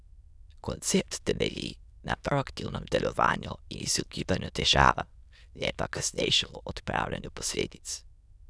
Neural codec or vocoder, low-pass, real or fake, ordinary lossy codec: autoencoder, 22.05 kHz, a latent of 192 numbers a frame, VITS, trained on many speakers; none; fake; none